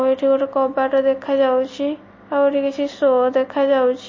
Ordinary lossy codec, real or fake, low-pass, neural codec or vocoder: MP3, 32 kbps; real; 7.2 kHz; none